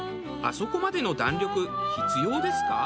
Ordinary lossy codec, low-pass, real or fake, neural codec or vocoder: none; none; real; none